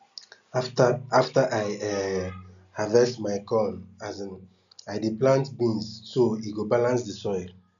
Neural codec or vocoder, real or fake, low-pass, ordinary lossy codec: none; real; 7.2 kHz; none